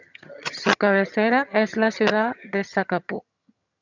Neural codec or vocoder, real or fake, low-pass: vocoder, 22.05 kHz, 80 mel bands, HiFi-GAN; fake; 7.2 kHz